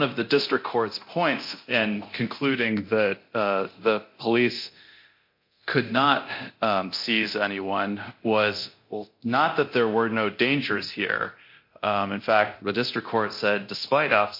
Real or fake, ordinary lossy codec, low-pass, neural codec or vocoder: fake; MP3, 32 kbps; 5.4 kHz; codec, 24 kHz, 0.9 kbps, DualCodec